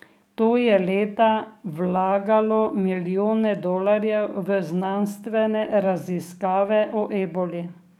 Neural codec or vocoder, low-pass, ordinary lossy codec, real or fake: codec, 44.1 kHz, 7.8 kbps, DAC; 19.8 kHz; none; fake